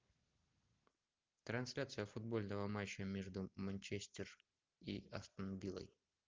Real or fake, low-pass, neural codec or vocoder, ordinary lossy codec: real; 7.2 kHz; none; Opus, 32 kbps